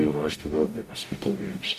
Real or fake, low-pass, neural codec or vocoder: fake; 14.4 kHz; codec, 44.1 kHz, 0.9 kbps, DAC